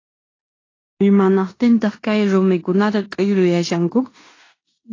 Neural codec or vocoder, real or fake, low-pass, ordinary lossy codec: codec, 16 kHz in and 24 kHz out, 0.9 kbps, LongCat-Audio-Codec, four codebook decoder; fake; 7.2 kHz; AAC, 32 kbps